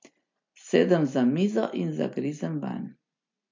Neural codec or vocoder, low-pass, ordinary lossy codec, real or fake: none; 7.2 kHz; MP3, 48 kbps; real